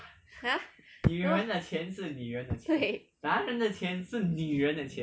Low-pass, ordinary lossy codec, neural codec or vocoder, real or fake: none; none; none; real